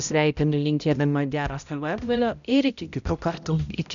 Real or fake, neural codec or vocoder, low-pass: fake; codec, 16 kHz, 0.5 kbps, X-Codec, HuBERT features, trained on balanced general audio; 7.2 kHz